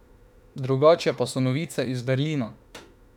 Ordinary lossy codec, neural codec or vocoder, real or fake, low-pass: none; autoencoder, 48 kHz, 32 numbers a frame, DAC-VAE, trained on Japanese speech; fake; 19.8 kHz